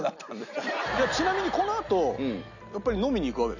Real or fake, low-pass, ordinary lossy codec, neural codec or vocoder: real; 7.2 kHz; none; none